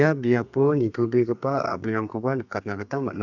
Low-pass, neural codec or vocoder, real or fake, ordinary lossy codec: 7.2 kHz; codec, 32 kHz, 1.9 kbps, SNAC; fake; none